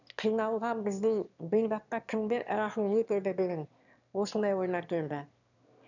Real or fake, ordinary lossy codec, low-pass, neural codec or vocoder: fake; none; 7.2 kHz; autoencoder, 22.05 kHz, a latent of 192 numbers a frame, VITS, trained on one speaker